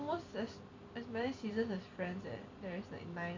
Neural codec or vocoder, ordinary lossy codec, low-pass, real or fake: vocoder, 44.1 kHz, 128 mel bands every 256 samples, BigVGAN v2; MP3, 64 kbps; 7.2 kHz; fake